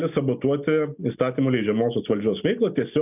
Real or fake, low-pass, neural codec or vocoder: real; 3.6 kHz; none